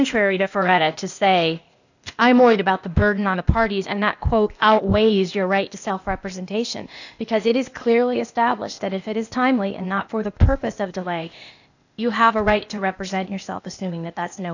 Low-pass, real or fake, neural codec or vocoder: 7.2 kHz; fake; codec, 16 kHz, 0.8 kbps, ZipCodec